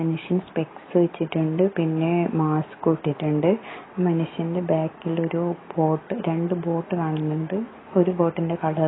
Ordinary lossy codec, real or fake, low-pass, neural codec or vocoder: AAC, 16 kbps; real; 7.2 kHz; none